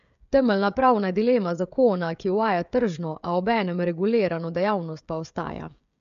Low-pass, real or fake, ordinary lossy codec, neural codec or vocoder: 7.2 kHz; fake; MP3, 64 kbps; codec, 16 kHz, 16 kbps, FreqCodec, smaller model